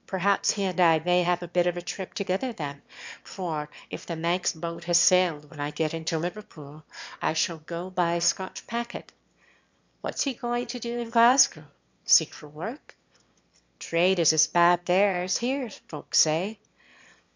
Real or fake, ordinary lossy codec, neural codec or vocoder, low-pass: fake; MP3, 64 kbps; autoencoder, 22.05 kHz, a latent of 192 numbers a frame, VITS, trained on one speaker; 7.2 kHz